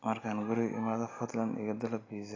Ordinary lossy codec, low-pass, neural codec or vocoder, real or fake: AAC, 32 kbps; 7.2 kHz; none; real